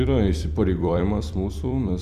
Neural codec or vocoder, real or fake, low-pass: vocoder, 44.1 kHz, 128 mel bands every 256 samples, BigVGAN v2; fake; 14.4 kHz